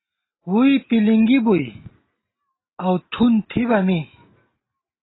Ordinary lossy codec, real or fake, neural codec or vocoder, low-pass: AAC, 16 kbps; real; none; 7.2 kHz